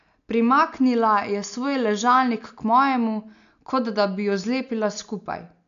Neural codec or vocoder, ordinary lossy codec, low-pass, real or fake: none; AAC, 96 kbps; 7.2 kHz; real